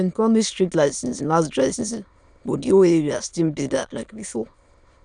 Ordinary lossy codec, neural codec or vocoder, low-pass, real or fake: none; autoencoder, 22.05 kHz, a latent of 192 numbers a frame, VITS, trained on many speakers; 9.9 kHz; fake